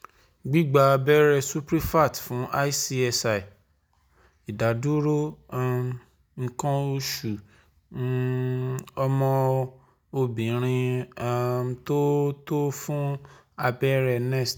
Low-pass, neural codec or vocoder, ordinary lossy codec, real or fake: none; none; none; real